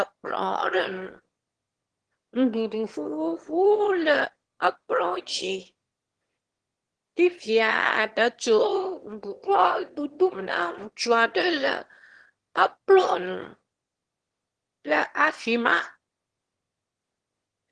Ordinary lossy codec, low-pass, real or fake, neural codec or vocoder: Opus, 16 kbps; 9.9 kHz; fake; autoencoder, 22.05 kHz, a latent of 192 numbers a frame, VITS, trained on one speaker